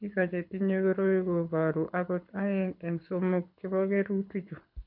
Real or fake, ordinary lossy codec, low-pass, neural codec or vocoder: fake; none; 5.4 kHz; codec, 24 kHz, 6 kbps, HILCodec